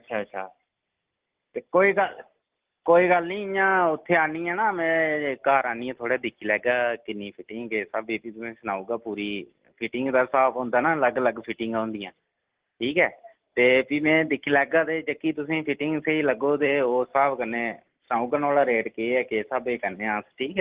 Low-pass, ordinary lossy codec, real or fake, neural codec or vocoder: 3.6 kHz; Opus, 16 kbps; real; none